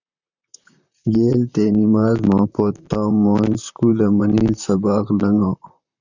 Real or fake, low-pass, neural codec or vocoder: fake; 7.2 kHz; vocoder, 44.1 kHz, 128 mel bands, Pupu-Vocoder